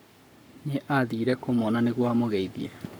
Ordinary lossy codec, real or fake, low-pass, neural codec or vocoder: none; fake; none; codec, 44.1 kHz, 7.8 kbps, Pupu-Codec